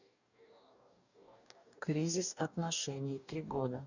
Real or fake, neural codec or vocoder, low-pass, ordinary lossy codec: fake; codec, 44.1 kHz, 2.6 kbps, DAC; 7.2 kHz; none